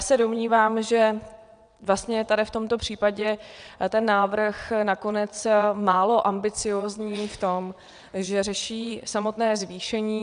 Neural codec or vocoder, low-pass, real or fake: vocoder, 22.05 kHz, 80 mel bands, WaveNeXt; 9.9 kHz; fake